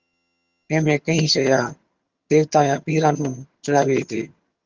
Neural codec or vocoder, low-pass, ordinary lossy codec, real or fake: vocoder, 22.05 kHz, 80 mel bands, HiFi-GAN; 7.2 kHz; Opus, 32 kbps; fake